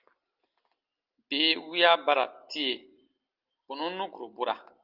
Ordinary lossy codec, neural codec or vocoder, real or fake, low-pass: Opus, 24 kbps; none; real; 5.4 kHz